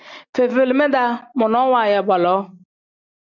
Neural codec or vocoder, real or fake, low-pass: none; real; 7.2 kHz